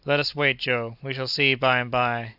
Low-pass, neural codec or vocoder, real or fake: 5.4 kHz; none; real